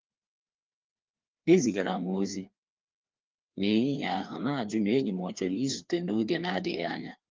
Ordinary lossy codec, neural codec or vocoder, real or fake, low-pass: Opus, 24 kbps; codec, 16 kHz, 2 kbps, FreqCodec, larger model; fake; 7.2 kHz